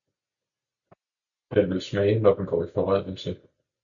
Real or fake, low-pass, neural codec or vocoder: real; 7.2 kHz; none